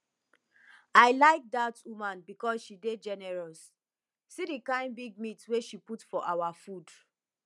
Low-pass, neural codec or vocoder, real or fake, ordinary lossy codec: none; none; real; none